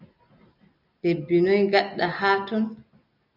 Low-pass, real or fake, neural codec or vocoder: 5.4 kHz; real; none